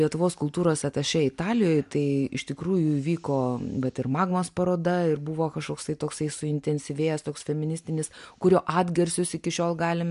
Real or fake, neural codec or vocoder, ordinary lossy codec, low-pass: real; none; MP3, 64 kbps; 10.8 kHz